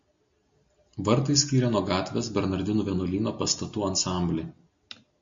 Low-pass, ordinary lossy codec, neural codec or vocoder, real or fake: 7.2 kHz; MP3, 48 kbps; none; real